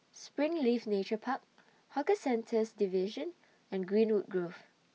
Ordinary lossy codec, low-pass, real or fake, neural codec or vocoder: none; none; real; none